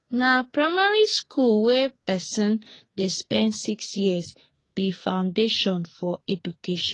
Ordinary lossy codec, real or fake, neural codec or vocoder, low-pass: AAC, 32 kbps; fake; codec, 44.1 kHz, 2.6 kbps, SNAC; 10.8 kHz